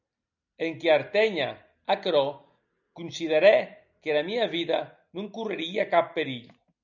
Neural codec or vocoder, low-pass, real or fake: none; 7.2 kHz; real